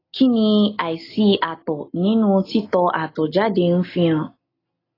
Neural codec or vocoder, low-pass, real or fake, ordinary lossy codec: none; 5.4 kHz; real; AAC, 24 kbps